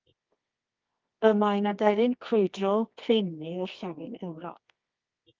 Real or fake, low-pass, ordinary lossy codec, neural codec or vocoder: fake; 7.2 kHz; Opus, 32 kbps; codec, 24 kHz, 0.9 kbps, WavTokenizer, medium music audio release